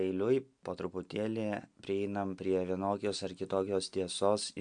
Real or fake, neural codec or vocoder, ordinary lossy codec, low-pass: real; none; MP3, 64 kbps; 9.9 kHz